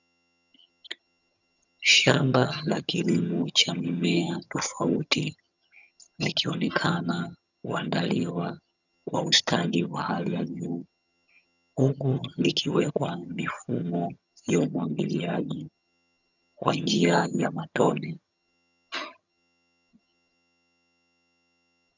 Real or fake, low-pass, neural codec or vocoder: fake; 7.2 kHz; vocoder, 22.05 kHz, 80 mel bands, HiFi-GAN